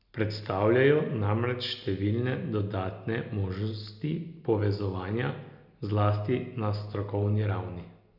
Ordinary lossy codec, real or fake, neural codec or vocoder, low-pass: none; real; none; 5.4 kHz